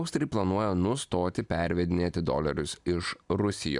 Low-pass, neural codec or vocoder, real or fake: 10.8 kHz; none; real